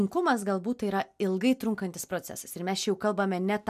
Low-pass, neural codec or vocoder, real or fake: 14.4 kHz; none; real